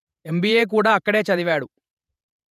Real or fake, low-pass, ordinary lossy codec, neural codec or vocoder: fake; 14.4 kHz; none; vocoder, 48 kHz, 128 mel bands, Vocos